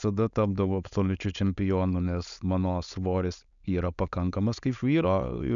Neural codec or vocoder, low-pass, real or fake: codec, 16 kHz, 4.8 kbps, FACodec; 7.2 kHz; fake